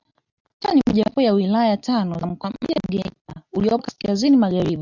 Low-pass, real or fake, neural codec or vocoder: 7.2 kHz; real; none